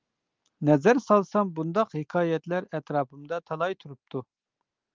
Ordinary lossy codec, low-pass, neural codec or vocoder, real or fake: Opus, 32 kbps; 7.2 kHz; none; real